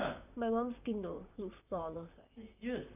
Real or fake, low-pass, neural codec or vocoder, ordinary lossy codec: fake; 3.6 kHz; vocoder, 44.1 kHz, 128 mel bands, Pupu-Vocoder; none